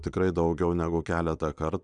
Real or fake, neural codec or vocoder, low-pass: fake; vocoder, 22.05 kHz, 80 mel bands, Vocos; 9.9 kHz